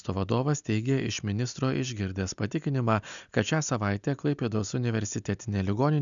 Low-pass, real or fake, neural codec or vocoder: 7.2 kHz; real; none